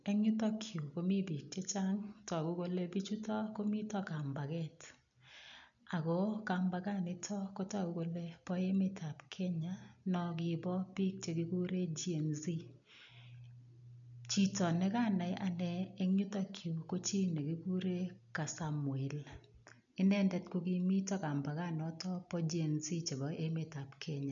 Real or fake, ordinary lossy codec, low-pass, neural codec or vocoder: real; none; 7.2 kHz; none